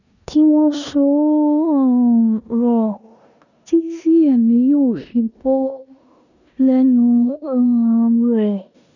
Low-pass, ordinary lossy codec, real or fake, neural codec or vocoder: 7.2 kHz; none; fake; codec, 16 kHz in and 24 kHz out, 0.9 kbps, LongCat-Audio-Codec, four codebook decoder